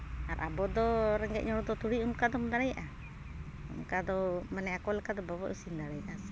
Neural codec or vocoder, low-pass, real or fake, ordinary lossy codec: none; none; real; none